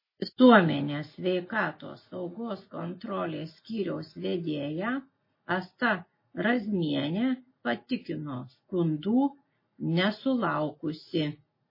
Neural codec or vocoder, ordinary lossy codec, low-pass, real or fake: none; MP3, 24 kbps; 5.4 kHz; real